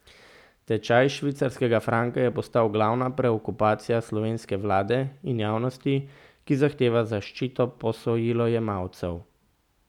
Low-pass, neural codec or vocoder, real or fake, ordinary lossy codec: 19.8 kHz; none; real; none